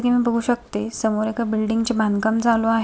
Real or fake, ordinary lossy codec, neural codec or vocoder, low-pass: real; none; none; none